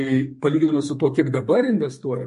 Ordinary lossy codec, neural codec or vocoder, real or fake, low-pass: MP3, 48 kbps; codec, 44.1 kHz, 2.6 kbps, SNAC; fake; 14.4 kHz